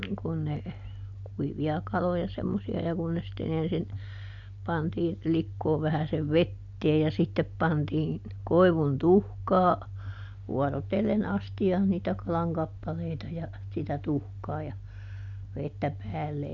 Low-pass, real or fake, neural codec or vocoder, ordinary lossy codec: 7.2 kHz; real; none; none